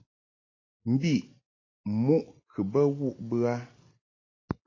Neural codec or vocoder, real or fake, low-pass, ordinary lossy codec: none; real; 7.2 kHz; AAC, 32 kbps